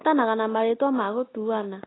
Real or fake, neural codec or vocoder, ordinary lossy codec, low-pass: real; none; AAC, 16 kbps; 7.2 kHz